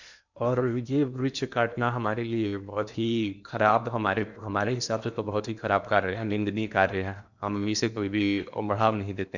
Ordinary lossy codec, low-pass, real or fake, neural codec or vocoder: none; 7.2 kHz; fake; codec, 16 kHz in and 24 kHz out, 0.8 kbps, FocalCodec, streaming, 65536 codes